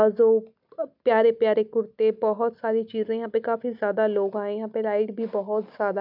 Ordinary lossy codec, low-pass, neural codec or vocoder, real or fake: none; 5.4 kHz; none; real